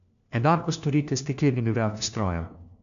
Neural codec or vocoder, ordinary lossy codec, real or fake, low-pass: codec, 16 kHz, 1 kbps, FunCodec, trained on LibriTTS, 50 frames a second; none; fake; 7.2 kHz